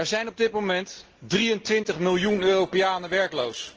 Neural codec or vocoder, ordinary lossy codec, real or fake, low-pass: none; Opus, 16 kbps; real; 7.2 kHz